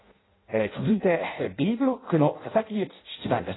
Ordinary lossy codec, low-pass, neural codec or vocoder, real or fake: AAC, 16 kbps; 7.2 kHz; codec, 16 kHz in and 24 kHz out, 0.6 kbps, FireRedTTS-2 codec; fake